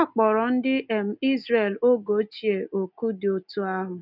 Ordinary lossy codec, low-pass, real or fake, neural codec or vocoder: none; 5.4 kHz; real; none